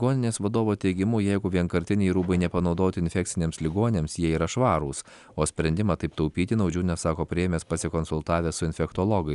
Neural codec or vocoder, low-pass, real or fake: none; 10.8 kHz; real